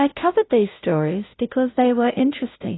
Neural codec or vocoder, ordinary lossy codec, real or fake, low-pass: codec, 16 kHz, 0.5 kbps, FunCodec, trained on Chinese and English, 25 frames a second; AAC, 16 kbps; fake; 7.2 kHz